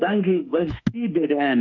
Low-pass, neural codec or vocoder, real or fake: 7.2 kHz; autoencoder, 48 kHz, 32 numbers a frame, DAC-VAE, trained on Japanese speech; fake